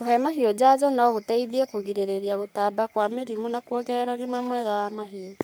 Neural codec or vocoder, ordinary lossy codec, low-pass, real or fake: codec, 44.1 kHz, 3.4 kbps, Pupu-Codec; none; none; fake